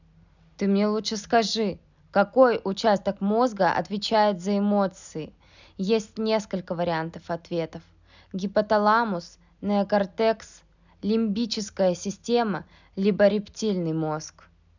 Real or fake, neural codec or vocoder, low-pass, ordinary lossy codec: real; none; 7.2 kHz; none